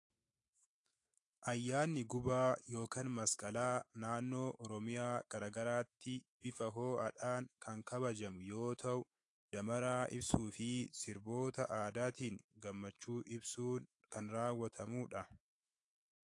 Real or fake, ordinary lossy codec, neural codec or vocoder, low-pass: fake; AAC, 48 kbps; autoencoder, 48 kHz, 128 numbers a frame, DAC-VAE, trained on Japanese speech; 10.8 kHz